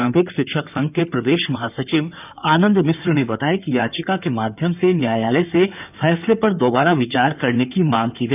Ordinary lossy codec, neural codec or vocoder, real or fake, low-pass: none; codec, 16 kHz in and 24 kHz out, 2.2 kbps, FireRedTTS-2 codec; fake; 3.6 kHz